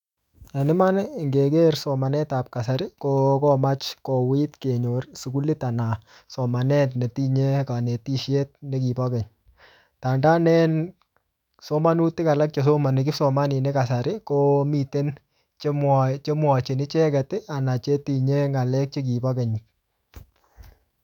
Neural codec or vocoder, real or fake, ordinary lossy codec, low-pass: autoencoder, 48 kHz, 128 numbers a frame, DAC-VAE, trained on Japanese speech; fake; none; 19.8 kHz